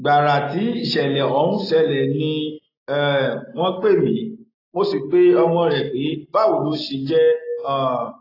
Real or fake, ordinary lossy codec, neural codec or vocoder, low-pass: real; AAC, 24 kbps; none; 5.4 kHz